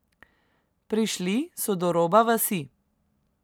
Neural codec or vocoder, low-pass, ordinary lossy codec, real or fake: vocoder, 44.1 kHz, 128 mel bands every 256 samples, BigVGAN v2; none; none; fake